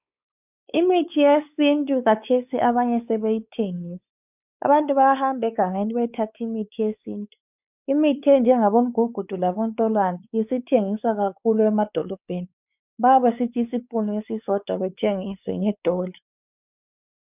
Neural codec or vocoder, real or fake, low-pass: codec, 16 kHz, 4 kbps, X-Codec, WavLM features, trained on Multilingual LibriSpeech; fake; 3.6 kHz